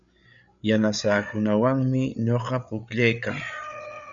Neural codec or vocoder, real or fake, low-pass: codec, 16 kHz, 8 kbps, FreqCodec, larger model; fake; 7.2 kHz